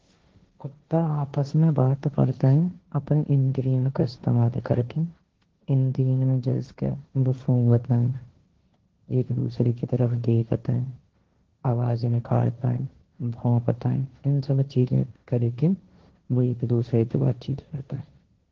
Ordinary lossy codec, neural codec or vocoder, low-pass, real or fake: Opus, 16 kbps; codec, 16 kHz, 1.1 kbps, Voila-Tokenizer; 7.2 kHz; fake